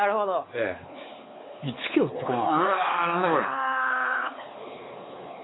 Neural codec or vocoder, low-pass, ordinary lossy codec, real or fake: codec, 16 kHz, 4 kbps, X-Codec, WavLM features, trained on Multilingual LibriSpeech; 7.2 kHz; AAC, 16 kbps; fake